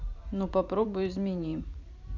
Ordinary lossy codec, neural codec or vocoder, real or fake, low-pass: none; vocoder, 44.1 kHz, 128 mel bands every 512 samples, BigVGAN v2; fake; 7.2 kHz